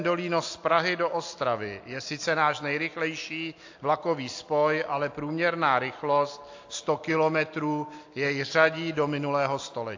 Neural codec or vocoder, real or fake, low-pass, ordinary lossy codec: none; real; 7.2 kHz; AAC, 48 kbps